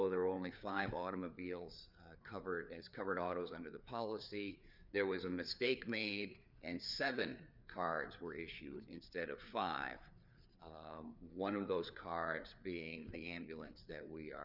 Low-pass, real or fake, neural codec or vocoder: 5.4 kHz; fake; codec, 16 kHz, 4 kbps, FreqCodec, larger model